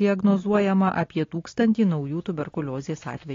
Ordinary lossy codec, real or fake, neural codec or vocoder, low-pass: AAC, 32 kbps; real; none; 7.2 kHz